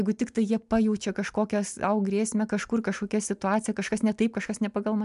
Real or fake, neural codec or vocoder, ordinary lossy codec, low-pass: real; none; MP3, 96 kbps; 10.8 kHz